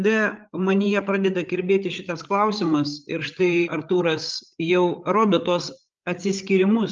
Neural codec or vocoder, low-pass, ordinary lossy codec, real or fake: codec, 16 kHz, 8 kbps, FreqCodec, larger model; 7.2 kHz; Opus, 24 kbps; fake